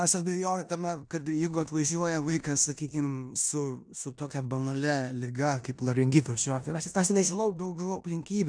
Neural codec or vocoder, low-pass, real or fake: codec, 16 kHz in and 24 kHz out, 0.9 kbps, LongCat-Audio-Codec, four codebook decoder; 9.9 kHz; fake